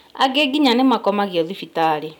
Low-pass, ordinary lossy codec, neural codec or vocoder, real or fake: 19.8 kHz; none; none; real